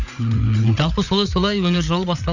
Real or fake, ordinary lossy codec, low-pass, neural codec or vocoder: fake; none; 7.2 kHz; codec, 16 kHz, 8 kbps, FreqCodec, larger model